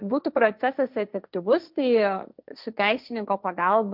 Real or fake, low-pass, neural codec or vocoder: fake; 5.4 kHz; codec, 16 kHz, 1.1 kbps, Voila-Tokenizer